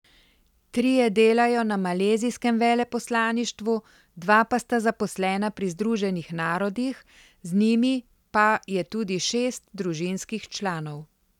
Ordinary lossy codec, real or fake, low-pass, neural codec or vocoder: none; real; 19.8 kHz; none